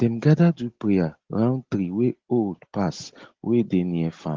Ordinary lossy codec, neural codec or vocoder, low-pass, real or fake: Opus, 16 kbps; none; 7.2 kHz; real